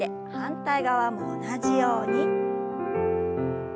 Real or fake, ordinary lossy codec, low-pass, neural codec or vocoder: real; none; none; none